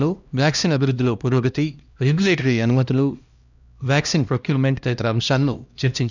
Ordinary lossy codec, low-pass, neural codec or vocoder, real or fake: none; 7.2 kHz; codec, 16 kHz, 1 kbps, X-Codec, HuBERT features, trained on LibriSpeech; fake